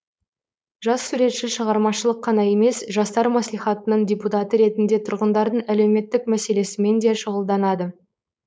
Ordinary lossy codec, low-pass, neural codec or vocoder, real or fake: none; none; codec, 16 kHz, 4.8 kbps, FACodec; fake